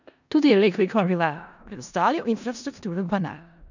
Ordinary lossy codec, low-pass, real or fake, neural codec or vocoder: none; 7.2 kHz; fake; codec, 16 kHz in and 24 kHz out, 0.4 kbps, LongCat-Audio-Codec, four codebook decoder